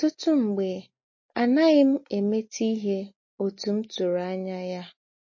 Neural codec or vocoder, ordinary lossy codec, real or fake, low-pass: none; MP3, 32 kbps; real; 7.2 kHz